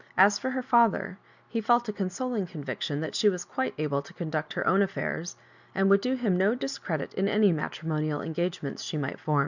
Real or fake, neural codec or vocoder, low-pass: real; none; 7.2 kHz